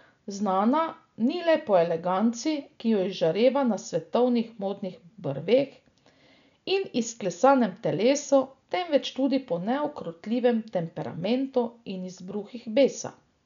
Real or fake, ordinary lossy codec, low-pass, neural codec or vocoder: real; none; 7.2 kHz; none